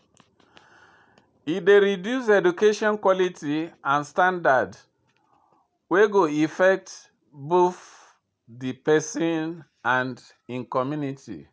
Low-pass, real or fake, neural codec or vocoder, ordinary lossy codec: none; real; none; none